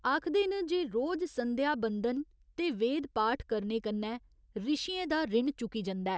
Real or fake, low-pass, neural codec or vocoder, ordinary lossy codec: real; none; none; none